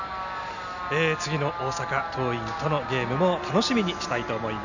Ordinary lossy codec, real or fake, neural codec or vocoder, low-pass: none; real; none; 7.2 kHz